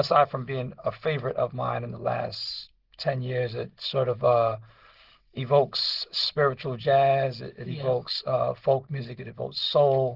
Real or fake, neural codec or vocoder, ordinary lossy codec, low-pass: real; none; Opus, 16 kbps; 5.4 kHz